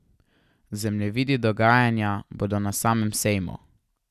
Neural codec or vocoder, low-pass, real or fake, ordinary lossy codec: none; 14.4 kHz; real; none